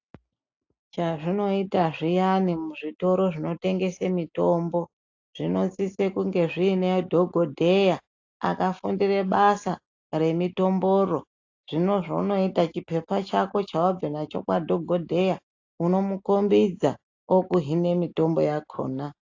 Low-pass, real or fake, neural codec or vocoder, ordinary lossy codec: 7.2 kHz; real; none; AAC, 48 kbps